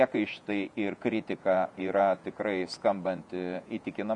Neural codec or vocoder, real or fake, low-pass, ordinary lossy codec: vocoder, 48 kHz, 128 mel bands, Vocos; fake; 10.8 kHz; MP3, 96 kbps